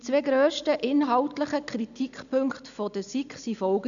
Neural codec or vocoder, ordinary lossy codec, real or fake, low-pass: none; none; real; 7.2 kHz